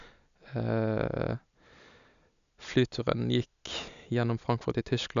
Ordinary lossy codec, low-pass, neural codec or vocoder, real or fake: MP3, 96 kbps; 7.2 kHz; none; real